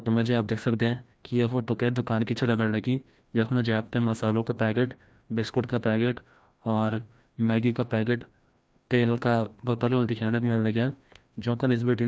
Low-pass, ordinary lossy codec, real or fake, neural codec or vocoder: none; none; fake; codec, 16 kHz, 1 kbps, FreqCodec, larger model